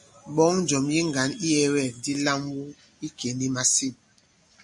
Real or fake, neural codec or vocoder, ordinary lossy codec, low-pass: real; none; MP3, 48 kbps; 10.8 kHz